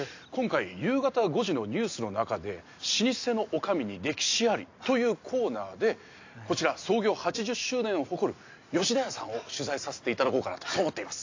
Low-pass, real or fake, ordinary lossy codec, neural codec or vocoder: 7.2 kHz; real; AAC, 48 kbps; none